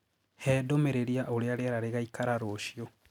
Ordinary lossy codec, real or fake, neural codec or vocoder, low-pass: none; real; none; 19.8 kHz